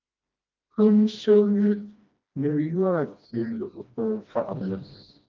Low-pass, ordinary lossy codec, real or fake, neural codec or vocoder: 7.2 kHz; Opus, 32 kbps; fake; codec, 16 kHz, 1 kbps, FreqCodec, smaller model